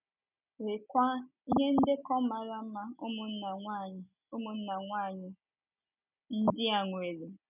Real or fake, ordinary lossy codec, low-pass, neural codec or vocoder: real; none; 3.6 kHz; none